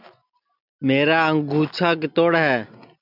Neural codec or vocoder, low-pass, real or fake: none; 5.4 kHz; real